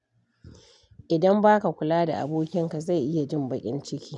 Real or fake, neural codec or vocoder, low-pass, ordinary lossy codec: real; none; 10.8 kHz; none